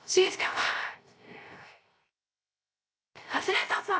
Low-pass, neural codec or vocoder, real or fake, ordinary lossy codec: none; codec, 16 kHz, 0.3 kbps, FocalCodec; fake; none